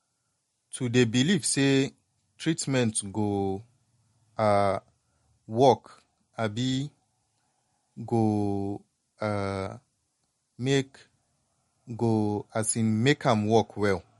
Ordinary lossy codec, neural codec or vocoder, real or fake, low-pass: MP3, 48 kbps; none; real; 19.8 kHz